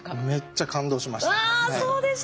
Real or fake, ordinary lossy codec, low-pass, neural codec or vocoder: real; none; none; none